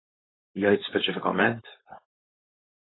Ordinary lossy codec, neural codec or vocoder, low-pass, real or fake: AAC, 16 kbps; codec, 16 kHz, 4.8 kbps, FACodec; 7.2 kHz; fake